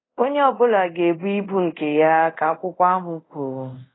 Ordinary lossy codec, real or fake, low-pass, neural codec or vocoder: AAC, 16 kbps; fake; 7.2 kHz; codec, 24 kHz, 0.5 kbps, DualCodec